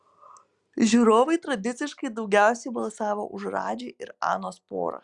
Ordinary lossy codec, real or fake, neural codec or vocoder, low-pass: Opus, 64 kbps; real; none; 10.8 kHz